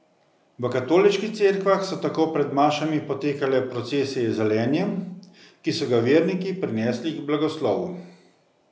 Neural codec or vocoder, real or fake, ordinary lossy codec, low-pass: none; real; none; none